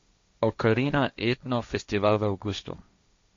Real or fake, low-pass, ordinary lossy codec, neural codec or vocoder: fake; 7.2 kHz; MP3, 48 kbps; codec, 16 kHz, 1.1 kbps, Voila-Tokenizer